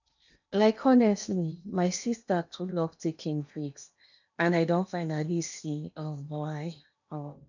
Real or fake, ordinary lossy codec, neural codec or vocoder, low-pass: fake; none; codec, 16 kHz in and 24 kHz out, 0.8 kbps, FocalCodec, streaming, 65536 codes; 7.2 kHz